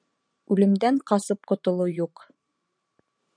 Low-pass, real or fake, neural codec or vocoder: 9.9 kHz; fake; vocoder, 44.1 kHz, 128 mel bands every 512 samples, BigVGAN v2